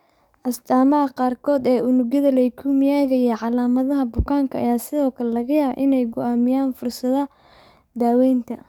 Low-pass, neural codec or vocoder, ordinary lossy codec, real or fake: 19.8 kHz; codec, 44.1 kHz, 7.8 kbps, DAC; none; fake